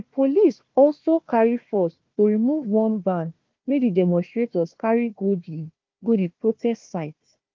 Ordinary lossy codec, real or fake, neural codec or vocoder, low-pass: Opus, 32 kbps; fake; codec, 16 kHz, 1 kbps, FunCodec, trained on Chinese and English, 50 frames a second; 7.2 kHz